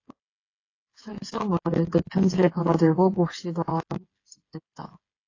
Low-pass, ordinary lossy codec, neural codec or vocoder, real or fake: 7.2 kHz; AAC, 32 kbps; codec, 16 kHz, 8 kbps, FreqCodec, smaller model; fake